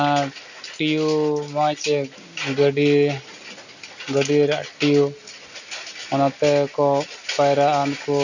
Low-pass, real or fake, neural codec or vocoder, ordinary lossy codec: 7.2 kHz; real; none; none